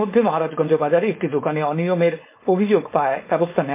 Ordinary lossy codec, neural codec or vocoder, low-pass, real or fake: MP3, 24 kbps; codec, 16 kHz, 4.8 kbps, FACodec; 3.6 kHz; fake